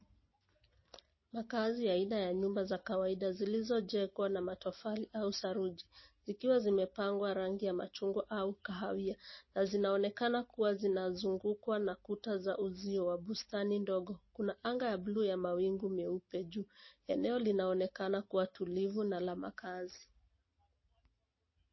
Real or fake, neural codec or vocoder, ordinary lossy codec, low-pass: real; none; MP3, 24 kbps; 7.2 kHz